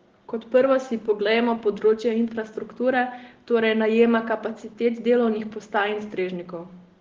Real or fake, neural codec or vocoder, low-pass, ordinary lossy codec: real; none; 7.2 kHz; Opus, 16 kbps